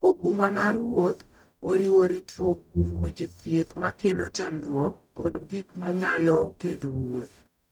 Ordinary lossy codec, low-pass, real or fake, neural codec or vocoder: none; 19.8 kHz; fake; codec, 44.1 kHz, 0.9 kbps, DAC